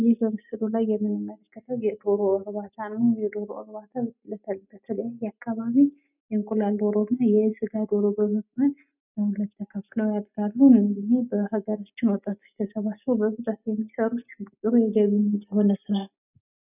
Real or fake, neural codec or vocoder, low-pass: real; none; 3.6 kHz